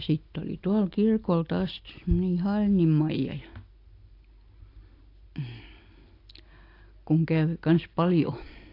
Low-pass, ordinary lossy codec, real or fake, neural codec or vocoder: 5.4 kHz; AAC, 32 kbps; real; none